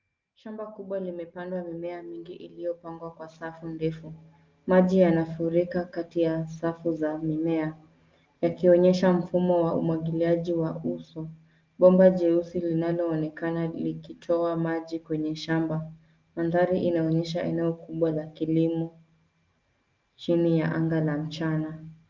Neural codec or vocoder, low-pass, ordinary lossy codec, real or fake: none; 7.2 kHz; Opus, 32 kbps; real